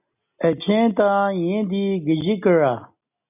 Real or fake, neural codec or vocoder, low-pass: real; none; 3.6 kHz